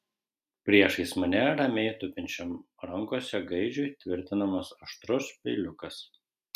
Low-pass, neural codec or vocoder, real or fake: 14.4 kHz; none; real